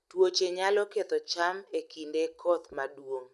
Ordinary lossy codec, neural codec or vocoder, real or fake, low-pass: none; none; real; none